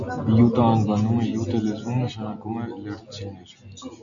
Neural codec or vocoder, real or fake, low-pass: none; real; 7.2 kHz